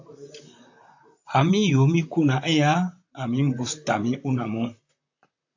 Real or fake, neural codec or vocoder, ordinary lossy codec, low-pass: fake; vocoder, 44.1 kHz, 128 mel bands, Pupu-Vocoder; AAC, 48 kbps; 7.2 kHz